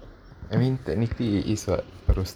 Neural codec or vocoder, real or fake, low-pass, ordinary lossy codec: vocoder, 44.1 kHz, 128 mel bands every 512 samples, BigVGAN v2; fake; none; none